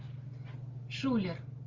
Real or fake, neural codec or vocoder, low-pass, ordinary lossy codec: real; none; 7.2 kHz; Opus, 32 kbps